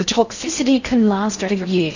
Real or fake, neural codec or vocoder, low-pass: fake; codec, 16 kHz in and 24 kHz out, 0.6 kbps, FocalCodec, streaming, 4096 codes; 7.2 kHz